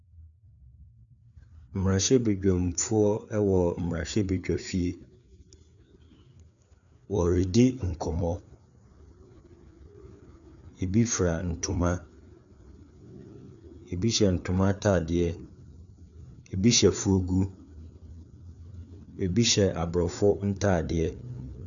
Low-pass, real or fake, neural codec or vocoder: 7.2 kHz; fake; codec, 16 kHz, 4 kbps, FreqCodec, larger model